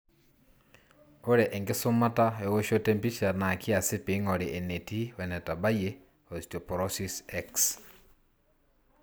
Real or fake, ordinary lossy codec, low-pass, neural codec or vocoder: real; none; none; none